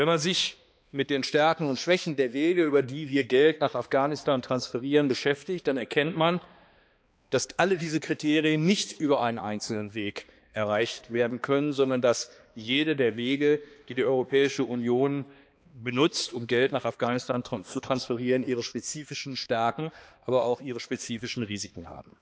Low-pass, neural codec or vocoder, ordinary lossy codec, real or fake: none; codec, 16 kHz, 2 kbps, X-Codec, HuBERT features, trained on balanced general audio; none; fake